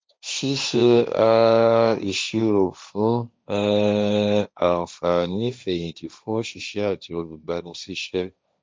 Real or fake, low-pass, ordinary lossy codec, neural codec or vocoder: fake; none; none; codec, 16 kHz, 1.1 kbps, Voila-Tokenizer